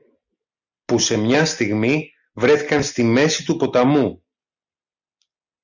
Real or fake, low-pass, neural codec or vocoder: real; 7.2 kHz; none